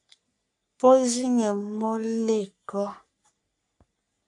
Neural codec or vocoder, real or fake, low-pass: codec, 44.1 kHz, 3.4 kbps, Pupu-Codec; fake; 10.8 kHz